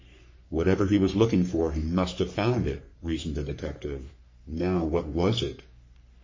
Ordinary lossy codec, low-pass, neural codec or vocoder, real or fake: MP3, 32 kbps; 7.2 kHz; codec, 44.1 kHz, 3.4 kbps, Pupu-Codec; fake